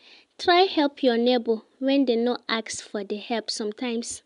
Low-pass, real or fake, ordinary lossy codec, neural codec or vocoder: 10.8 kHz; real; none; none